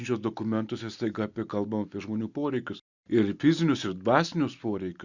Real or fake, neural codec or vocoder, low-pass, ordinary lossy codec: real; none; 7.2 kHz; Opus, 64 kbps